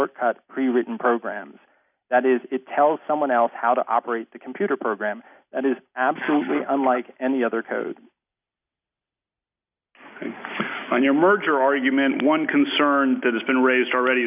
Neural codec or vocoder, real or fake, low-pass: none; real; 3.6 kHz